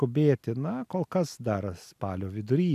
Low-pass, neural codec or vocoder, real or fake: 14.4 kHz; none; real